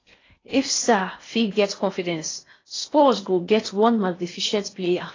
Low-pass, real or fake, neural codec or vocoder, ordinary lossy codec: 7.2 kHz; fake; codec, 16 kHz in and 24 kHz out, 0.6 kbps, FocalCodec, streaming, 4096 codes; AAC, 32 kbps